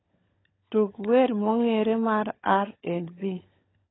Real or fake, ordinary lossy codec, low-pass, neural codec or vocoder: fake; AAC, 16 kbps; 7.2 kHz; codec, 16 kHz, 16 kbps, FunCodec, trained on LibriTTS, 50 frames a second